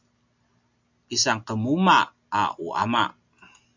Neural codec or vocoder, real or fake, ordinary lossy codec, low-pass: none; real; MP3, 64 kbps; 7.2 kHz